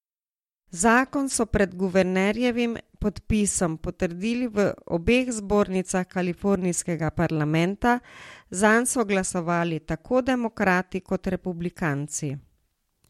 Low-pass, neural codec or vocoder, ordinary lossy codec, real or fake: 19.8 kHz; none; MP3, 64 kbps; real